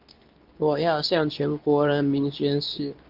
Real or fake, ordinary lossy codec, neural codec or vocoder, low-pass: fake; Opus, 24 kbps; codec, 24 kHz, 0.9 kbps, WavTokenizer, medium speech release version 2; 5.4 kHz